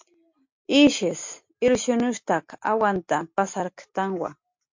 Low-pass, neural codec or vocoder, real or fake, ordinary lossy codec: 7.2 kHz; none; real; MP3, 48 kbps